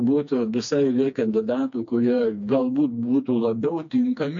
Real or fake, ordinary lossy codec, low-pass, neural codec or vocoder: fake; MP3, 48 kbps; 7.2 kHz; codec, 16 kHz, 2 kbps, FreqCodec, smaller model